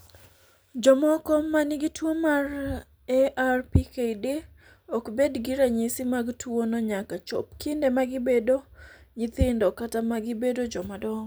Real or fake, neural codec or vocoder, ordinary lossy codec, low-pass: real; none; none; none